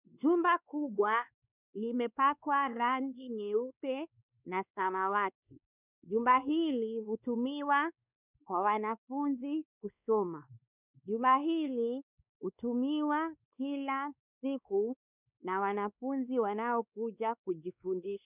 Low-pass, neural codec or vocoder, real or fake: 3.6 kHz; codec, 16 kHz, 2 kbps, X-Codec, WavLM features, trained on Multilingual LibriSpeech; fake